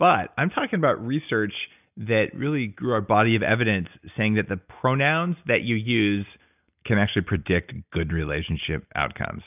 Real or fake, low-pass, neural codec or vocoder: real; 3.6 kHz; none